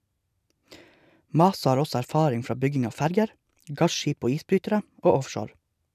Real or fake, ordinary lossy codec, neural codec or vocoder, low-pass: real; none; none; 14.4 kHz